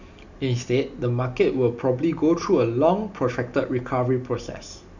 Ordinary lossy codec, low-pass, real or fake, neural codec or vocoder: none; 7.2 kHz; real; none